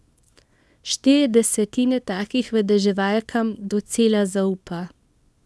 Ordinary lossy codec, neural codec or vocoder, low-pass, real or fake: none; codec, 24 kHz, 0.9 kbps, WavTokenizer, small release; none; fake